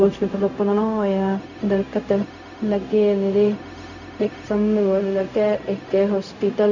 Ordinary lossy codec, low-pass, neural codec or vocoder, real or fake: none; 7.2 kHz; codec, 16 kHz, 0.4 kbps, LongCat-Audio-Codec; fake